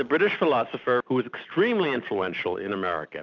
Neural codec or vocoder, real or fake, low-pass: none; real; 7.2 kHz